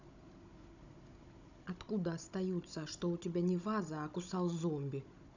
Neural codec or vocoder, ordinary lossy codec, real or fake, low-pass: codec, 16 kHz, 16 kbps, FunCodec, trained on Chinese and English, 50 frames a second; none; fake; 7.2 kHz